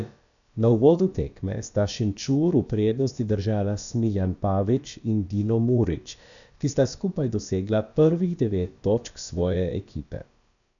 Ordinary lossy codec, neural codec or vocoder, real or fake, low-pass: none; codec, 16 kHz, about 1 kbps, DyCAST, with the encoder's durations; fake; 7.2 kHz